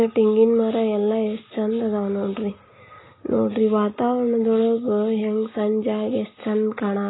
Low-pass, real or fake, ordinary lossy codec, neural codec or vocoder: 7.2 kHz; real; AAC, 16 kbps; none